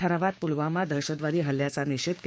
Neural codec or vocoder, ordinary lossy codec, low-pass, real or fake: codec, 16 kHz, 6 kbps, DAC; none; none; fake